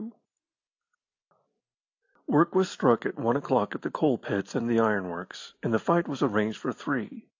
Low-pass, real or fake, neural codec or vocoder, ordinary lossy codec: 7.2 kHz; real; none; AAC, 48 kbps